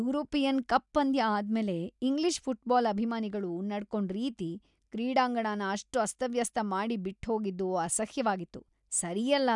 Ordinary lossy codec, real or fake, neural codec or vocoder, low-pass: none; real; none; 10.8 kHz